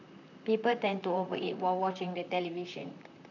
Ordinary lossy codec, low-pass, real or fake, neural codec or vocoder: none; 7.2 kHz; fake; vocoder, 44.1 kHz, 128 mel bands, Pupu-Vocoder